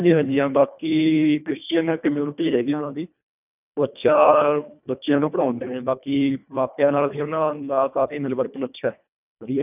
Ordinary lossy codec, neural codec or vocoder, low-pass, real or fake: none; codec, 24 kHz, 1.5 kbps, HILCodec; 3.6 kHz; fake